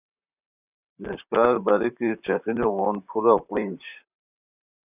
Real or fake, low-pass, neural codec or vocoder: fake; 3.6 kHz; vocoder, 44.1 kHz, 128 mel bands, Pupu-Vocoder